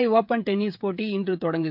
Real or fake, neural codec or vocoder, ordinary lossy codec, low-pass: fake; codec, 16 kHz, 16 kbps, FreqCodec, smaller model; MP3, 48 kbps; 5.4 kHz